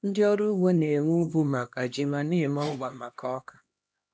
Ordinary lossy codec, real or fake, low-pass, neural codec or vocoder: none; fake; none; codec, 16 kHz, 1 kbps, X-Codec, HuBERT features, trained on LibriSpeech